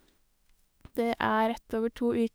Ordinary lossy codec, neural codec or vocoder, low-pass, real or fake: none; autoencoder, 48 kHz, 32 numbers a frame, DAC-VAE, trained on Japanese speech; none; fake